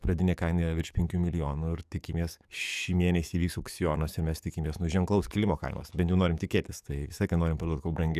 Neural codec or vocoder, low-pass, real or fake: codec, 44.1 kHz, 7.8 kbps, DAC; 14.4 kHz; fake